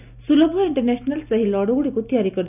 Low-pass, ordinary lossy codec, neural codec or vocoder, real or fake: 3.6 kHz; none; none; real